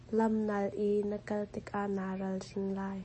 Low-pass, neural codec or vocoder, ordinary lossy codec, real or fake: 10.8 kHz; none; MP3, 32 kbps; real